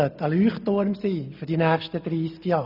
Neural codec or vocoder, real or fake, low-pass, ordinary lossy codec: none; real; 5.4 kHz; none